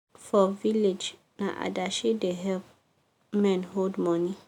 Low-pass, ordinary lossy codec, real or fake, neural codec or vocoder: 19.8 kHz; none; real; none